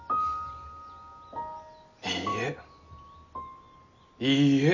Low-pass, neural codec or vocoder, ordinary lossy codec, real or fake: 7.2 kHz; none; none; real